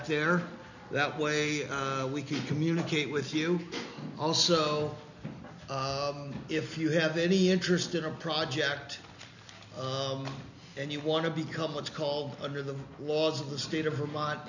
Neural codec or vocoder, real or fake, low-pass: none; real; 7.2 kHz